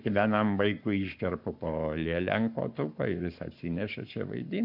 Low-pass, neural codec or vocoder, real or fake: 5.4 kHz; codec, 44.1 kHz, 7.8 kbps, Pupu-Codec; fake